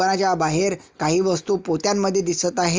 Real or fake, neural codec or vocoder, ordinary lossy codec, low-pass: real; none; Opus, 32 kbps; 7.2 kHz